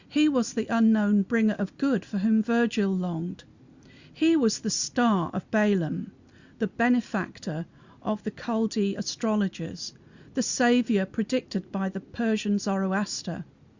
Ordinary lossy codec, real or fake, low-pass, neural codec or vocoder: Opus, 64 kbps; real; 7.2 kHz; none